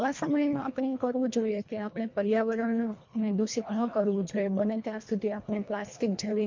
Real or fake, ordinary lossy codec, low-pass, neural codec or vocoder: fake; MP3, 48 kbps; 7.2 kHz; codec, 24 kHz, 1.5 kbps, HILCodec